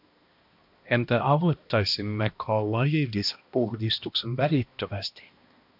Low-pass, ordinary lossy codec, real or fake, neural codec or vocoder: 5.4 kHz; MP3, 48 kbps; fake; codec, 16 kHz, 1 kbps, X-Codec, HuBERT features, trained on balanced general audio